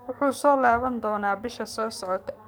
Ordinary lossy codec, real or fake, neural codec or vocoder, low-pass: none; fake; codec, 44.1 kHz, 7.8 kbps, DAC; none